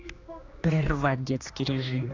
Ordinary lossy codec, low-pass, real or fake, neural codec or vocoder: AAC, 32 kbps; 7.2 kHz; fake; codec, 16 kHz, 2 kbps, X-Codec, HuBERT features, trained on general audio